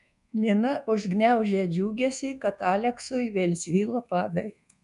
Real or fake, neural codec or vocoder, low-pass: fake; codec, 24 kHz, 1.2 kbps, DualCodec; 10.8 kHz